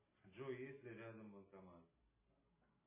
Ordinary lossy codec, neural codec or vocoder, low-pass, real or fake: MP3, 16 kbps; none; 3.6 kHz; real